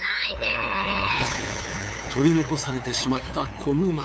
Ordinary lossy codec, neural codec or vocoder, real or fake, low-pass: none; codec, 16 kHz, 2 kbps, FunCodec, trained on LibriTTS, 25 frames a second; fake; none